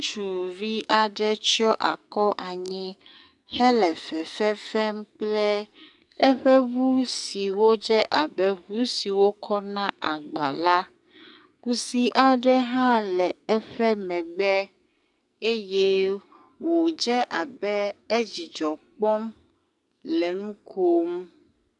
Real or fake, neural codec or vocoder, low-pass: fake; codec, 44.1 kHz, 2.6 kbps, SNAC; 10.8 kHz